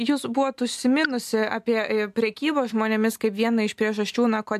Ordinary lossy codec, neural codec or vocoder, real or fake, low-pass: AAC, 96 kbps; none; real; 14.4 kHz